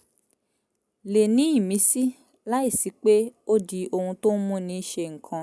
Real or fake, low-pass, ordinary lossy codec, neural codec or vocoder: real; none; none; none